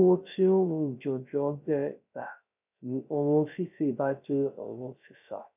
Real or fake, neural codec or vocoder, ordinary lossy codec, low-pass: fake; codec, 16 kHz, 0.3 kbps, FocalCodec; none; 3.6 kHz